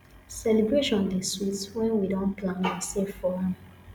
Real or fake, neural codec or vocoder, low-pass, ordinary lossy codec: real; none; 19.8 kHz; none